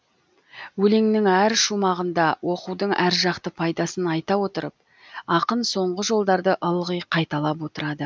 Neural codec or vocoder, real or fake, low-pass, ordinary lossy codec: none; real; none; none